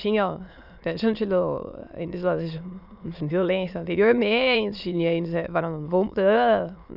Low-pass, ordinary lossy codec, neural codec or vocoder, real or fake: 5.4 kHz; none; autoencoder, 22.05 kHz, a latent of 192 numbers a frame, VITS, trained on many speakers; fake